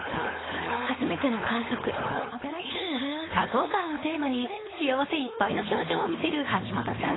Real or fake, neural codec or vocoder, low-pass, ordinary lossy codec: fake; codec, 16 kHz, 4.8 kbps, FACodec; 7.2 kHz; AAC, 16 kbps